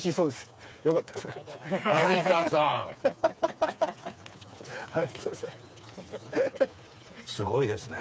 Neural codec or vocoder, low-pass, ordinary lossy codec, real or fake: codec, 16 kHz, 4 kbps, FreqCodec, smaller model; none; none; fake